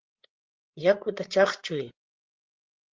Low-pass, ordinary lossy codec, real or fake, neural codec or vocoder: 7.2 kHz; Opus, 16 kbps; fake; codec, 16 kHz, 8 kbps, FreqCodec, larger model